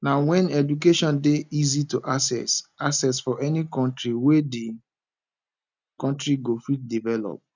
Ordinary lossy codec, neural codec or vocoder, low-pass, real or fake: none; none; 7.2 kHz; real